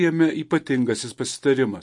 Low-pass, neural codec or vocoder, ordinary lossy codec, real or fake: 10.8 kHz; vocoder, 44.1 kHz, 128 mel bands every 256 samples, BigVGAN v2; MP3, 48 kbps; fake